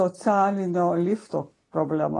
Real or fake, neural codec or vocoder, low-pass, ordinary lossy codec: real; none; 10.8 kHz; AAC, 32 kbps